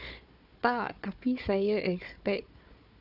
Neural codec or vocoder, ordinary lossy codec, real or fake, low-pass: codec, 16 kHz, 4 kbps, FunCodec, trained on Chinese and English, 50 frames a second; none; fake; 5.4 kHz